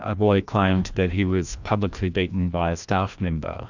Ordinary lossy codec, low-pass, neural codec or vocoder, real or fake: Opus, 64 kbps; 7.2 kHz; codec, 16 kHz, 1 kbps, FreqCodec, larger model; fake